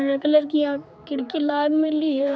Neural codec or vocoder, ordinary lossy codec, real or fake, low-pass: codec, 16 kHz, 4 kbps, X-Codec, HuBERT features, trained on general audio; none; fake; none